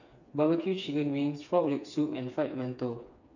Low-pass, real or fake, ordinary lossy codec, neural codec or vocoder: 7.2 kHz; fake; none; codec, 16 kHz, 4 kbps, FreqCodec, smaller model